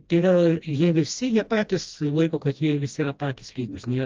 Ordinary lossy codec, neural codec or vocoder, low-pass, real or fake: Opus, 32 kbps; codec, 16 kHz, 1 kbps, FreqCodec, smaller model; 7.2 kHz; fake